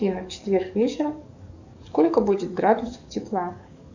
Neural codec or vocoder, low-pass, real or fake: codec, 44.1 kHz, 7.8 kbps, DAC; 7.2 kHz; fake